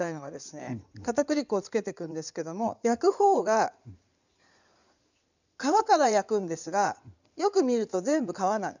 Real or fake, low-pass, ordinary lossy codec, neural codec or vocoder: fake; 7.2 kHz; none; codec, 16 kHz in and 24 kHz out, 2.2 kbps, FireRedTTS-2 codec